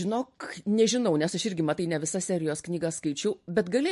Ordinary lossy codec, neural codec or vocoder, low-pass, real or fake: MP3, 48 kbps; none; 14.4 kHz; real